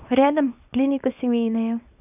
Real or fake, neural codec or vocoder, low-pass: fake; codec, 24 kHz, 0.9 kbps, WavTokenizer, small release; 3.6 kHz